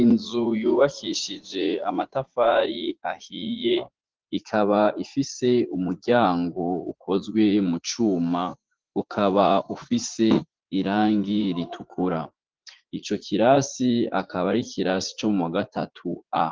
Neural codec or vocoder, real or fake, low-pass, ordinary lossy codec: vocoder, 44.1 kHz, 80 mel bands, Vocos; fake; 7.2 kHz; Opus, 16 kbps